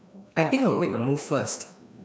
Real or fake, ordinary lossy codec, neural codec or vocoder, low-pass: fake; none; codec, 16 kHz, 1 kbps, FreqCodec, larger model; none